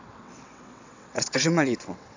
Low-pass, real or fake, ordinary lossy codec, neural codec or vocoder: 7.2 kHz; fake; AAC, 48 kbps; vocoder, 22.05 kHz, 80 mel bands, WaveNeXt